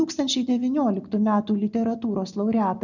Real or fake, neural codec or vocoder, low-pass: real; none; 7.2 kHz